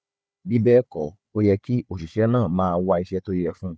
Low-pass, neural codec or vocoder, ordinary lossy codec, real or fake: none; codec, 16 kHz, 4 kbps, FunCodec, trained on Chinese and English, 50 frames a second; none; fake